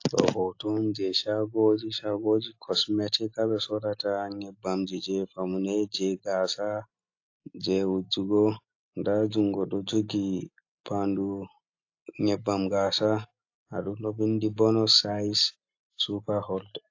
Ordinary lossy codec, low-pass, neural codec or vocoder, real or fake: AAC, 48 kbps; 7.2 kHz; none; real